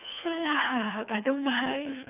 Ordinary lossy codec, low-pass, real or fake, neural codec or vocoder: none; 3.6 kHz; fake; codec, 24 kHz, 3 kbps, HILCodec